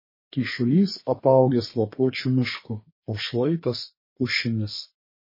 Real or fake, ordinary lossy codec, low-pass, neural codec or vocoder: fake; MP3, 24 kbps; 5.4 kHz; codec, 32 kHz, 1.9 kbps, SNAC